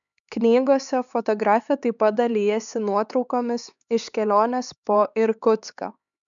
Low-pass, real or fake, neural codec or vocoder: 7.2 kHz; fake; codec, 16 kHz, 4 kbps, X-Codec, HuBERT features, trained on LibriSpeech